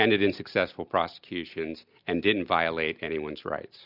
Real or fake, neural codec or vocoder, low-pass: real; none; 5.4 kHz